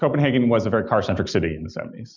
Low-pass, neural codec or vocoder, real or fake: 7.2 kHz; none; real